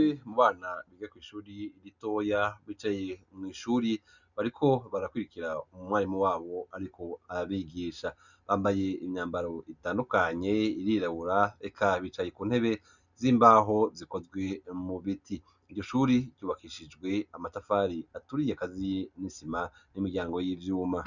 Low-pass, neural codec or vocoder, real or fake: 7.2 kHz; none; real